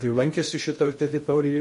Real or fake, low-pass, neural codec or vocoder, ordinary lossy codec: fake; 10.8 kHz; codec, 16 kHz in and 24 kHz out, 0.6 kbps, FocalCodec, streaming, 2048 codes; MP3, 48 kbps